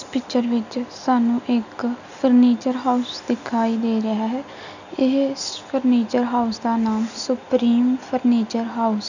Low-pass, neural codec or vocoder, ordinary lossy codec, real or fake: 7.2 kHz; none; none; real